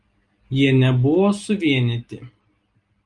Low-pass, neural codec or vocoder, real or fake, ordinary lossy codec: 10.8 kHz; none; real; Opus, 32 kbps